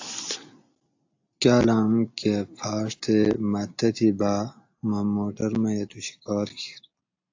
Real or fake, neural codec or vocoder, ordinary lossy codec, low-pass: real; none; AAC, 48 kbps; 7.2 kHz